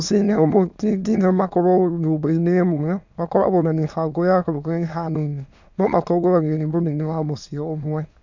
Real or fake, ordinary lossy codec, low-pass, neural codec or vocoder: fake; none; 7.2 kHz; autoencoder, 22.05 kHz, a latent of 192 numbers a frame, VITS, trained on many speakers